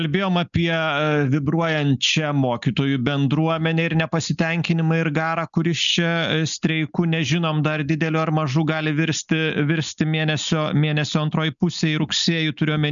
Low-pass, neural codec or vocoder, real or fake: 7.2 kHz; none; real